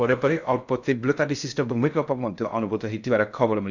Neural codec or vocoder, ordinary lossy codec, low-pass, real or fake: codec, 16 kHz in and 24 kHz out, 0.6 kbps, FocalCodec, streaming, 2048 codes; none; 7.2 kHz; fake